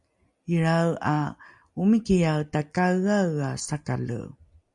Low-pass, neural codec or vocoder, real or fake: 10.8 kHz; none; real